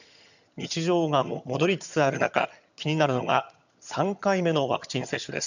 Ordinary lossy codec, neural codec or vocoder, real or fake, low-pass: none; vocoder, 22.05 kHz, 80 mel bands, HiFi-GAN; fake; 7.2 kHz